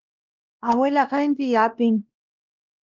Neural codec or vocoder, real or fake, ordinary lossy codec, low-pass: codec, 16 kHz, 1 kbps, X-Codec, WavLM features, trained on Multilingual LibriSpeech; fake; Opus, 16 kbps; 7.2 kHz